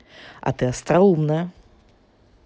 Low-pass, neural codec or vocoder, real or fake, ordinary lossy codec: none; none; real; none